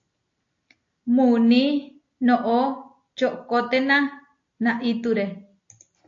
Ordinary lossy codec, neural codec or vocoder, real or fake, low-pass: AAC, 48 kbps; none; real; 7.2 kHz